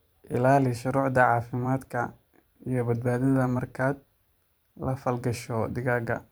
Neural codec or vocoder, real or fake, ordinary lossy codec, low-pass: vocoder, 44.1 kHz, 128 mel bands every 512 samples, BigVGAN v2; fake; none; none